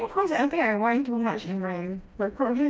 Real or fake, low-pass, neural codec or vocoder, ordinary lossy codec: fake; none; codec, 16 kHz, 1 kbps, FreqCodec, smaller model; none